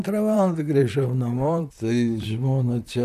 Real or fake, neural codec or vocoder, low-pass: fake; vocoder, 44.1 kHz, 128 mel bands, Pupu-Vocoder; 14.4 kHz